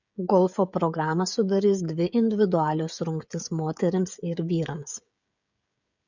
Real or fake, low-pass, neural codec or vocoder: fake; 7.2 kHz; codec, 16 kHz, 16 kbps, FreqCodec, smaller model